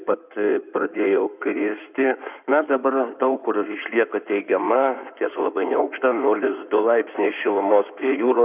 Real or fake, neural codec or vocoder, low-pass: fake; codec, 16 kHz in and 24 kHz out, 2.2 kbps, FireRedTTS-2 codec; 3.6 kHz